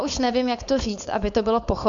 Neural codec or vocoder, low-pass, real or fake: codec, 16 kHz, 4.8 kbps, FACodec; 7.2 kHz; fake